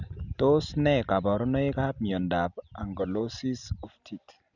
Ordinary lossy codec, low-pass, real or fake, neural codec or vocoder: none; 7.2 kHz; real; none